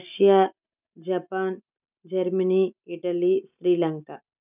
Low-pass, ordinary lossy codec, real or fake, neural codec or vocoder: 3.6 kHz; none; real; none